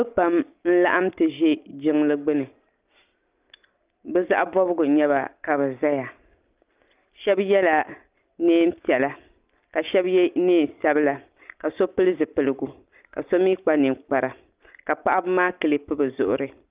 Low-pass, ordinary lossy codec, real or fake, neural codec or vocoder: 3.6 kHz; Opus, 24 kbps; real; none